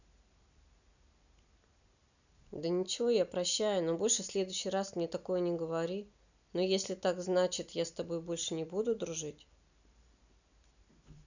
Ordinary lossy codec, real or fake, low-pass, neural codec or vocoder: none; real; 7.2 kHz; none